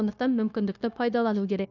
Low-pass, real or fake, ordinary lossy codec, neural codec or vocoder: 7.2 kHz; fake; none; codec, 16 kHz, 0.9 kbps, LongCat-Audio-Codec